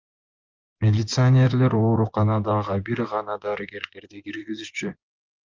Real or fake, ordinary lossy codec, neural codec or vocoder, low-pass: fake; Opus, 24 kbps; vocoder, 24 kHz, 100 mel bands, Vocos; 7.2 kHz